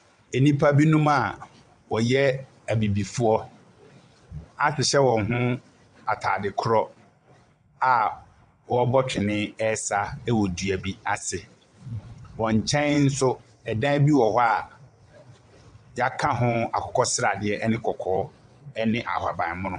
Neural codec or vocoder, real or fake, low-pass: vocoder, 22.05 kHz, 80 mel bands, WaveNeXt; fake; 9.9 kHz